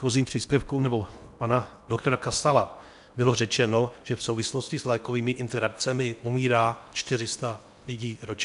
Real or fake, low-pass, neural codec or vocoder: fake; 10.8 kHz; codec, 16 kHz in and 24 kHz out, 0.8 kbps, FocalCodec, streaming, 65536 codes